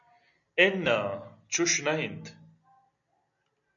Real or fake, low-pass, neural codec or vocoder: real; 7.2 kHz; none